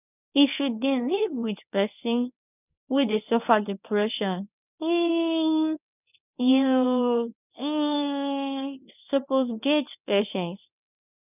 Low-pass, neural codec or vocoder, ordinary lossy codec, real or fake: 3.6 kHz; codec, 24 kHz, 0.9 kbps, WavTokenizer, medium speech release version 1; none; fake